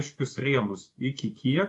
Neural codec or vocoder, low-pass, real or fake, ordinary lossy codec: vocoder, 24 kHz, 100 mel bands, Vocos; 10.8 kHz; fake; AAC, 48 kbps